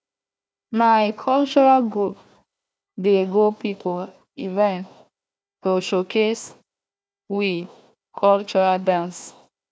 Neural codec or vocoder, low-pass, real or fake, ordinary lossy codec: codec, 16 kHz, 1 kbps, FunCodec, trained on Chinese and English, 50 frames a second; none; fake; none